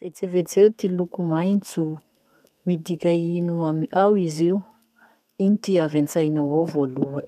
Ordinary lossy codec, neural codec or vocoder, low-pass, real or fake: none; codec, 32 kHz, 1.9 kbps, SNAC; 14.4 kHz; fake